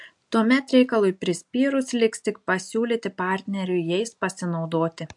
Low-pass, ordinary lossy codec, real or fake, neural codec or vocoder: 10.8 kHz; MP3, 64 kbps; real; none